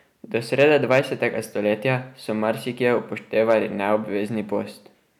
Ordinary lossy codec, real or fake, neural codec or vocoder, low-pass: none; real; none; 19.8 kHz